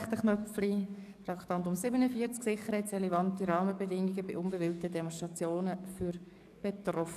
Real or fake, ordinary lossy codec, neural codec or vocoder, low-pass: fake; none; codec, 44.1 kHz, 7.8 kbps, DAC; 14.4 kHz